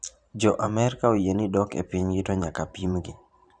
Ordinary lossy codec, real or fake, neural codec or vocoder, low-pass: none; fake; vocoder, 44.1 kHz, 128 mel bands every 512 samples, BigVGAN v2; 9.9 kHz